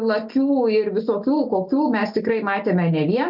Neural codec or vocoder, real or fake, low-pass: none; real; 5.4 kHz